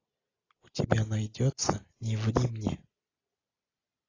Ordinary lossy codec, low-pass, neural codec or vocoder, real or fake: AAC, 32 kbps; 7.2 kHz; none; real